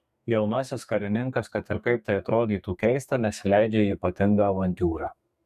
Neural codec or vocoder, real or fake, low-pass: codec, 32 kHz, 1.9 kbps, SNAC; fake; 14.4 kHz